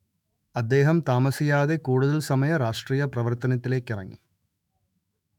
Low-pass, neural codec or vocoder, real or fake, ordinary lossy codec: 19.8 kHz; autoencoder, 48 kHz, 128 numbers a frame, DAC-VAE, trained on Japanese speech; fake; none